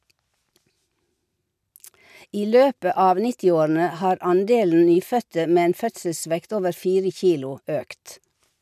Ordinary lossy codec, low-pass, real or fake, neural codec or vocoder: none; 14.4 kHz; real; none